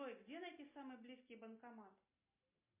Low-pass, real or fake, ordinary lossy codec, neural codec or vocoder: 3.6 kHz; real; MP3, 24 kbps; none